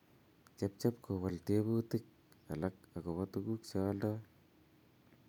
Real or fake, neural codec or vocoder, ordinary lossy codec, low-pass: real; none; none; 19.8 kHz